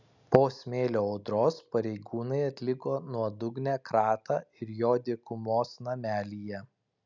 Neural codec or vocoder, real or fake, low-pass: none; real; 7.2 kHz